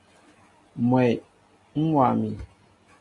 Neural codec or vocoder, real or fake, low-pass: none; real; 10.8 kHz